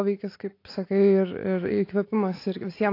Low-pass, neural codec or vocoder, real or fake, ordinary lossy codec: 5.4 kHz; none; real; AAC, 32 kbps